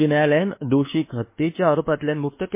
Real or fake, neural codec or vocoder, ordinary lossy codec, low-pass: fake; codec, 24 kHz, 1.2 kbps, DualCodec; MP3, 24 kbps; 3.6 kHz